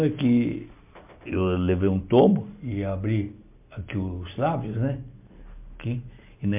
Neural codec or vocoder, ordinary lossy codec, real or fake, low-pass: none; MP3, 32 kbps; real; 3.6 kHz